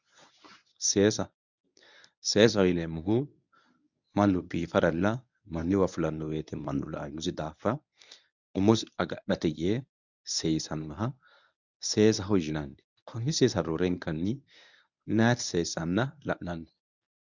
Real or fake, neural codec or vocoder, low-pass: fake; codec, 24 kHz, 0.9 kbps, WavTokenizer, medium speech release version 1; 7.2 kHz